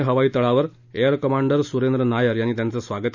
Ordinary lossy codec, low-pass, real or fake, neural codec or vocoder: none; 7.2 kHz; real; none